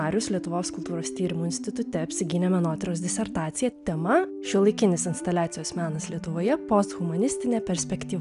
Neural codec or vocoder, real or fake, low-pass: none; real; 10.8 kHz